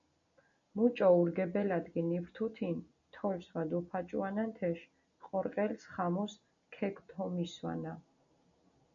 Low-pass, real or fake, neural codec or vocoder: 7.2 kHz; real; none